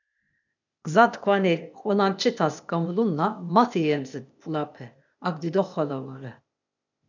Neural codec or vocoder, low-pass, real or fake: codec, 16 kHz, 0.8 kbps, ZipCodec; 7.2 kHz; fake